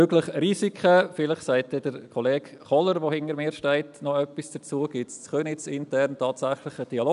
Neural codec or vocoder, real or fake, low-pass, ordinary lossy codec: none; real; 10.8 kHz; none